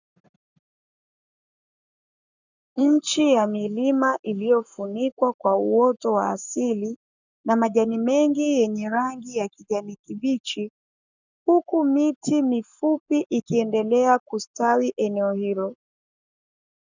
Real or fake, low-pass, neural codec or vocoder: fake; 7.2 kHz; codec, 44.1 kHz, 7.8 kbps, Pupu-Codec